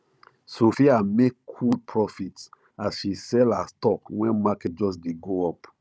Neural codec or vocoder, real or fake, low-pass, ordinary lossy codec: codec, 16 kHz, 16 kbps, FunCodec, trained on Chinese and English, 50 frames a second; fake; none; none